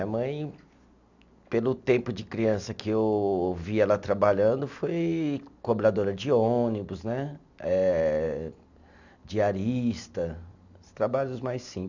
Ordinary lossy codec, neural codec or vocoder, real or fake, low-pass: none; none; real; 7.2 kHz